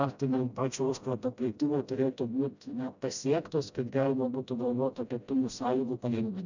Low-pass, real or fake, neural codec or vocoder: 7.2 kHz; fake; codec, 16 kHz, 0.5 kbps, FreqCodec, smaller model